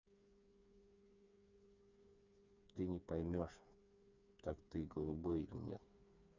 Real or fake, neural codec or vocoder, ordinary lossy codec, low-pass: fake; codec, 16 kHz, 4 kbps, FreqCodec, smaller model; MP3, 64 kbps; 7.2 kHz